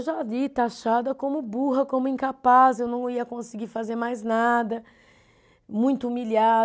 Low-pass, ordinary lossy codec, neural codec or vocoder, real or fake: none; none; none; real